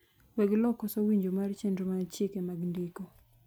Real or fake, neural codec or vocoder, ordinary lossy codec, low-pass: real; none; none; none